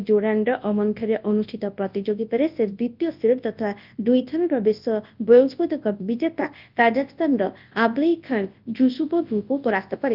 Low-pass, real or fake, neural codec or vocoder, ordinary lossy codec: 5.4 kHz; fake; codec, 24 kHz, 0.9 kbps, WavTokenizer, large speech release; Opus, 24 kbps